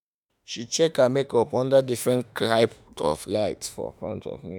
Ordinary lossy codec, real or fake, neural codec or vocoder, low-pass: none; fake; autoencoder, 48 kHz, 32 numbers a frame, DAC-VAE, trained on Japanese speech; none